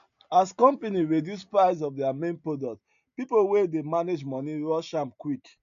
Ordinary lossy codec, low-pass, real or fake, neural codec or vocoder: MP3, 96 kbps; 7.2 kHz; real; none